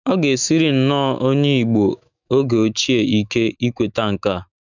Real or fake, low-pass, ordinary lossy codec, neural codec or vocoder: fake; 7.2 kHz; none; autoencoder, 48 kHz, 128 numbers a frame, DAC-VAE, trained on Japanese speech